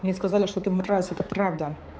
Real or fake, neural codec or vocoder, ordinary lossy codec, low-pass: fake; codec, 16 kHz, 4 kbps, X-Codec, HuBERT features, trained on balanced general audio; none; none